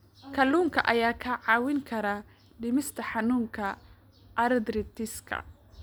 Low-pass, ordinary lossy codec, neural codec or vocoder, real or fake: none; none; none; real